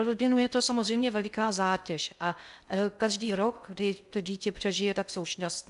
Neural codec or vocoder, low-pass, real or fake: codec, 16 kHz in and 24 kHz out, 0.6 kbps, FocalCodec, streaming, 2048 codes; 10.8 kHz; fake